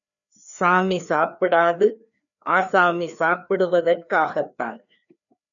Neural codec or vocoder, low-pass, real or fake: codec, 16 kHz, 2 kbps, FreqCodec, larger model; 7.2 kHz; fake